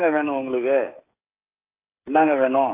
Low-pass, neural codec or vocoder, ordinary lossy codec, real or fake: 3.6 kHz; codec, 16 kHz, 16 kbps, FreqCodec, smaller model; AAC, 24 kbps; fake